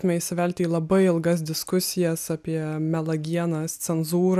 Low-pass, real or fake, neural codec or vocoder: 14.4 kHz; real; none